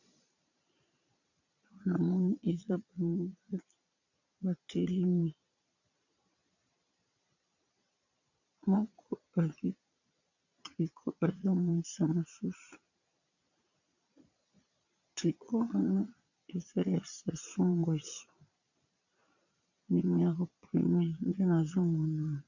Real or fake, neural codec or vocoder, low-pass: fake; vocoder, 22.05 kHz, 80 mel bands, Vocos; 7.2 kHz